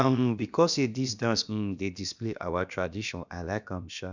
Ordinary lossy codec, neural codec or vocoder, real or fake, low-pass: none; codec, 16 kHz, about 1 kbps, DyCAST, with the encoder's durations; fake; 7.2 kHz